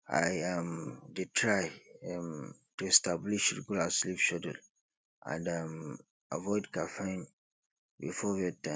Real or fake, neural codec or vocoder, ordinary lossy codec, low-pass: real; none; none; none